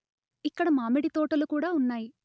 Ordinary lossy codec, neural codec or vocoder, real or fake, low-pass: none; none; real; none